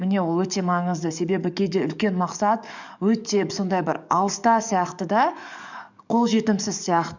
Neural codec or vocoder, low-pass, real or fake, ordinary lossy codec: codec, 44.1 kHz, 7.8 kbps, DAC; 7.2 kHz; fake; none